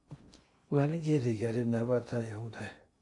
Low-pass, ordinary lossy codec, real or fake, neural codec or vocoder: 10.8 kHz; MP3, 48 kbps; fake; codec, 16 kHz in and 24 kHz out, 0.6 kbps, FocalCodec, streaming, 2048 codes